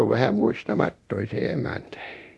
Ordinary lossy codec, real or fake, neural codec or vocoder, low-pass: none; fake; codec, 24 kHz, 0.9 kbps, DualCodec; none